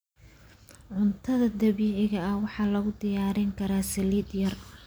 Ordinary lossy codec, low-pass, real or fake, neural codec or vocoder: none; none; real; none